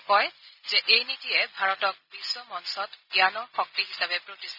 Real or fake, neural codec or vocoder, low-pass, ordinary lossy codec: real; none; 5.4 kHz; none